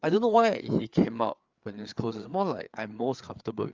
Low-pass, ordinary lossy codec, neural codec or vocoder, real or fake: 7.2 kHz; Opus, 32 kbps; codec, 16 kHz, 4 kbps, FreqCodec, larger model; fake